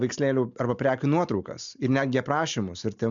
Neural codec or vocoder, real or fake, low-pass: none; real; 7.2 kHz